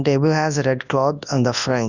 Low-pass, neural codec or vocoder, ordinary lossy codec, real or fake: 7.2 kHz; codec, 24 kHz, 0.9 kbps, WavTokenizer, large speech release; none; fake